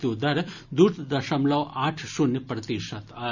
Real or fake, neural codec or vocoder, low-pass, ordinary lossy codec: real; none; 7.2 kHz; none